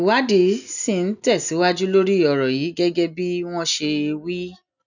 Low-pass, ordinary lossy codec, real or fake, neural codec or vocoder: 7.2 kHz; none; real; none